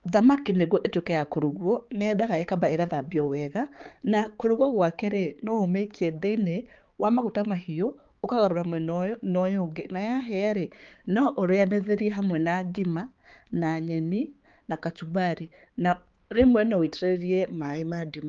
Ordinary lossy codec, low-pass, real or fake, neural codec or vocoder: Opus, 32 kbps; 7.2 kHz; fake; codec, 16 kHz, 4 kbps, X-Codec, HuBERT features, trained on balanced general audio